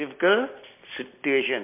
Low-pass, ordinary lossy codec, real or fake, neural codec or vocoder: 3.6 kHz; MP3, 24 kbps; real; none